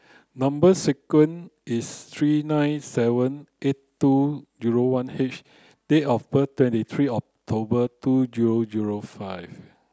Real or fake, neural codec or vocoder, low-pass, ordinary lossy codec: real; none; none; none